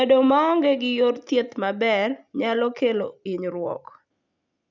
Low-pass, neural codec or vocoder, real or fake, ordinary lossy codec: 7.2 kHz; none; real; none